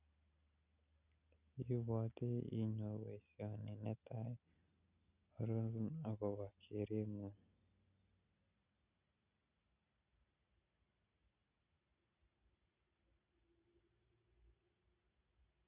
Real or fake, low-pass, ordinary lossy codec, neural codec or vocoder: real; 3.6 kHz; none; none